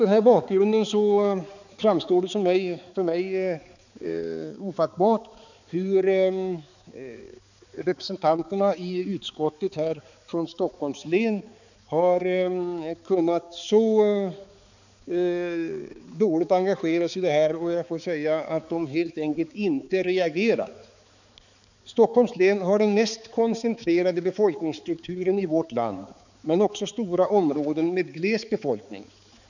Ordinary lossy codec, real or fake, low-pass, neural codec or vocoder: none; fake; 7.2 kHz; codec, 16 kHz, 4 kbps, X-Codec, HuBERT features, trained on balanced general audio